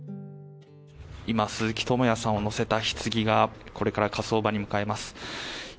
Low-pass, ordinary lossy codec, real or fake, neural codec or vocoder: none; none; real; none